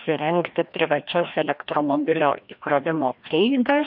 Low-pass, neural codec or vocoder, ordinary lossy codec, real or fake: 5.4 kHz; codec, 16 kHz, 1 kbps, FreqCodec, larger model; MP3, 48 kbps; fake